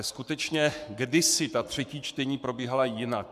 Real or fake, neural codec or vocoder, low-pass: fake; codec, 44.1 kHz, 7.8 kbps, Pupu-Codec; 14.4 kHz